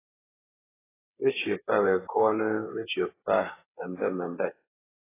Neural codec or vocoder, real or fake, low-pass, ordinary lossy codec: codec, 16 kHz, 8 kbps, FreqCodec, smaller model; fake; 3.6 kHz; AAC, 16 kbps